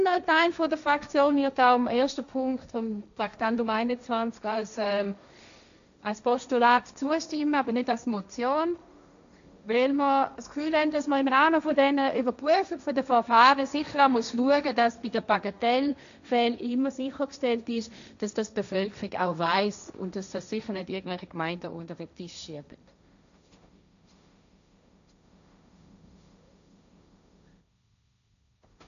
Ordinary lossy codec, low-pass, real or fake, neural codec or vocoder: AAC, 96 kbps; 7.2 kHz; fake; codec, 16 kHz, 1.1 kbps, Voila-Tokenizer